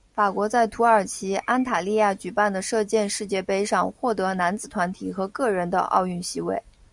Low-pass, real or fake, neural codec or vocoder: 10.8 kHz; real; none